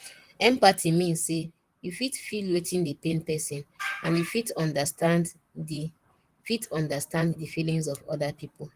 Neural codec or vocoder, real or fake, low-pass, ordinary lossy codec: vocoder, 44.1 kHz, 128 mel bands, Pupu-Vocoder; fake; 14.4 kHz; Opus, 24 kbps